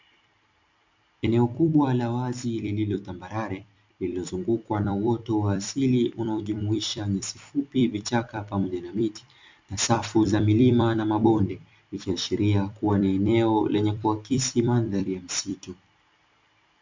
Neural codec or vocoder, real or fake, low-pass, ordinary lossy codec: vocoder, 44.1 kHz, 128 mel bands every 256 samples, BigVGAN v2; fake; 7.2 kHz; MP3, 64 kbps